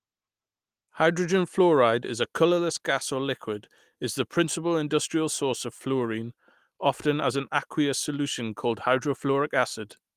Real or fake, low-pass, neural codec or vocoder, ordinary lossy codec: real; 14.4 kHz; none; Opus, 24 kbps